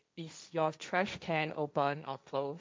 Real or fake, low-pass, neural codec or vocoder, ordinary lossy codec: fake; none; codec, 16 kHz, 1.1 kbps, Voila-Tokenizer; none